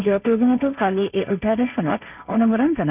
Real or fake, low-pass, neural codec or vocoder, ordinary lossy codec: fake; 3.6 kHz; codec, 16 kHz, 1.1 kbps, Voila-Tokenizer; none